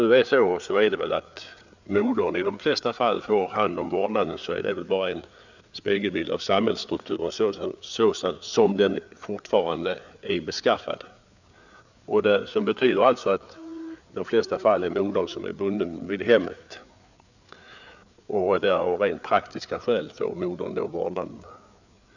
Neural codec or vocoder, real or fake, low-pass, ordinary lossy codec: codec, 16 kHz, 4 kbps, FreqCodec, larger model; fake; 7.2 kHz; none